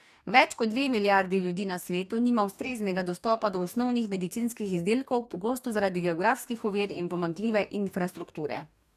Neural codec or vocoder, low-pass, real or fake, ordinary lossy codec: codec, 44.1 kHz, 2.6 kbps, DAC; 14.4 kHz; fake; none